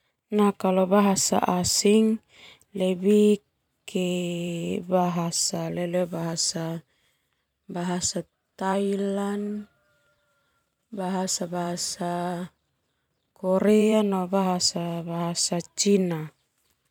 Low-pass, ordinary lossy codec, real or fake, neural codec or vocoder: 19.8 kHz; none; fake; vocoder, 44.1 kHz, 128 mel bands every 512 samples, BigVGAN v2